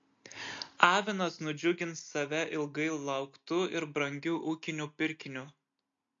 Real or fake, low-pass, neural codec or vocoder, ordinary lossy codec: real; 7.2 kHz; none; MP3, 48 kbps